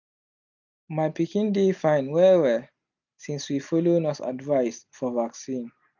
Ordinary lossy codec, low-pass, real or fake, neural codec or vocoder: none; 7.2 kHz; real; none